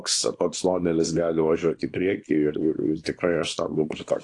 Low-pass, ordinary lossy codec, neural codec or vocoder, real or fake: 10.8 kHz; AAC, 48 kbps; codec, 24 kHz, 0.9 kbps, WavTokenizer, small release; fake